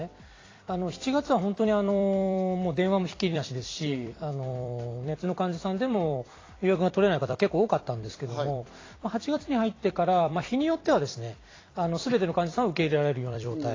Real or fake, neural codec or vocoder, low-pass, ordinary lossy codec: fake; vocoder, 44.1 kHz, 128 mel bands every 256 samples, BigVGAN v2; 7.2 kHz; AAC, 32 kbps